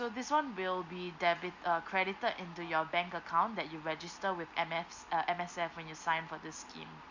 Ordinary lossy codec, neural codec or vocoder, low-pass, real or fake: Opus, 64 kbps; none; 7.2 kHz; real